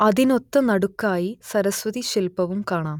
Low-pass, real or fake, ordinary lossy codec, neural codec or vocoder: 19.8 kHz; real; none; none